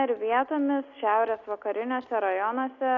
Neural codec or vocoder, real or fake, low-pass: none; real; 7.2 kHz